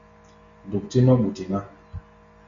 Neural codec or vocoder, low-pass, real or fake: none; 7.2 kHz; real